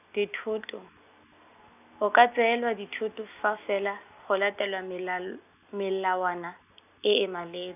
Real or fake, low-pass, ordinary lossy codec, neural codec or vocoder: real; 3.6 kHz; none; none